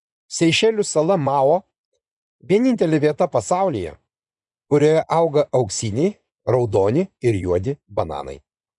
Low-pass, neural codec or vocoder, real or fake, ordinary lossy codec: 10.8 kHz; vocoder, 24 kHz, 100 mel bands, Vocos; fake; MP3, 96 kbps